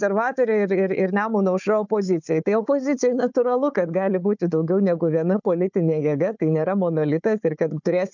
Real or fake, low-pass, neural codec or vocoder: fake; 7.2 kHz; codec, 16 kHz, 4 kbps, FunCodec, trained on Chinese and English, 50 frames a second